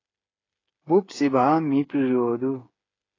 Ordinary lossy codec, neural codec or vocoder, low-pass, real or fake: AAC, 32 kbps; codec, 16 kHz, 8 kbps, FreqCodec, smaller model; 7.2 kHz; fake